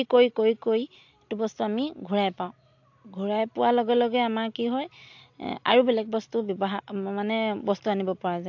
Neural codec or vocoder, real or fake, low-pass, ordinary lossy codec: none; real; 7.2 kHz; AAC, 48 kbps